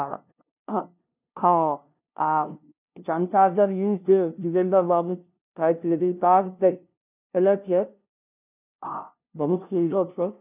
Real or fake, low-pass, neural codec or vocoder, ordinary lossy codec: fake; 3.6 kHz; codec, 16 kHz, 0.5 kbps, FunCodec, trained on LibriTTS, 25 frames a second; none